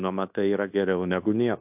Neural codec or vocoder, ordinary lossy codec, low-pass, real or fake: codec, 16 kHz in and 24 kHz out, 0.9 kbps, LongCat-Audio-Codec, fine tuned four codebook decoder; AAC, 32 kbps; 3.6 kHz; fake